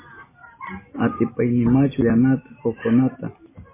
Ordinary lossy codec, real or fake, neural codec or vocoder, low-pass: MP3, 16 kbps; real; none; 3.6 kHz